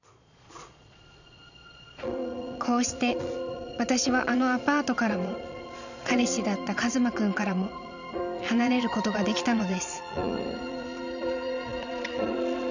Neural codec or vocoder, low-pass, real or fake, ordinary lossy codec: vocoder, 22.05 kHz, 80 mel bands, Vocos; 7.2 kHz; fake; none